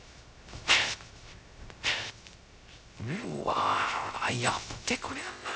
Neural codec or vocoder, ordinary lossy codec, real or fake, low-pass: codec, 16 kHz, 0.3 kbps, FocalCodec; none; fake; none